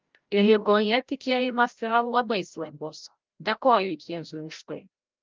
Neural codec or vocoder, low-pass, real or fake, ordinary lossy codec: codec, 16 kHz, 0.5 kbps, FreqCodec, larger model; 7.2 kHz; fake; Opus, 32 kbps